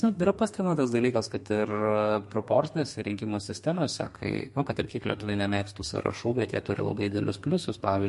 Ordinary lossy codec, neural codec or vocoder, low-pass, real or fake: MP3, 48 kbps; codec, 32 kHz, 1.9 kbps, SNAC; 14.4 kHz; fake